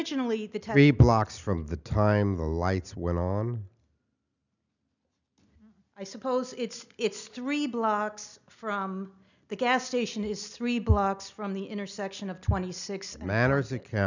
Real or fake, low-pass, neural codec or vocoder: real; 7.2 kHz; none